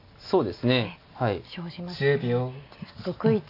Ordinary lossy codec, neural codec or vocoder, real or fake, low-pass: AAC, 32 kbps; none; real; 5.4 kHz